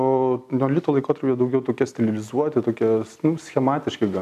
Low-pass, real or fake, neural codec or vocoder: 14.4 kHz; real; none